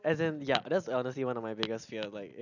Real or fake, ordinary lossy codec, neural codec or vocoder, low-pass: real; none; none; 7.2 kHz